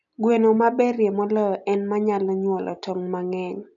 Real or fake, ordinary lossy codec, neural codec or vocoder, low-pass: real; none; none; 7.2 kHz